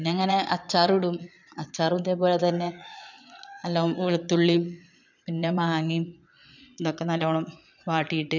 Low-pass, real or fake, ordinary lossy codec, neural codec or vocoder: 7.2 kHz; fake; none; vocoder, 22.05 kHz, 80 mel bands, Vocos